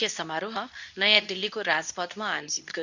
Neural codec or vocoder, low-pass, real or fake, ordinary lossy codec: codec, 24 kHz, 0.9 kbps, WavTokenizer, medium speech release version 2; 7.2 kHz; fake; none